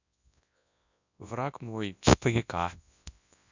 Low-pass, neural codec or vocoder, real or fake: 7.2 kHz; codec, 24 kHz, 0.9 kbps, WavTokenizer, large speech release; fake